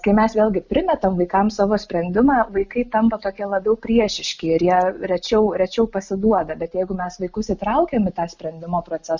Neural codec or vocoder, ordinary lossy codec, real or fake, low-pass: none; Opus, 64 kbps; real; 7.2 kHz